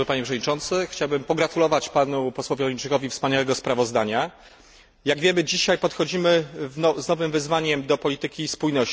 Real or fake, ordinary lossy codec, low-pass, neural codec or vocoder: real; none; none; none